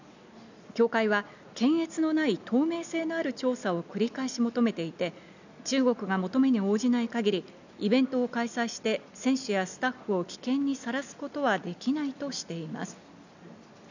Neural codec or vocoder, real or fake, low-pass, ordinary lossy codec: none; real; 7.2 kHz; none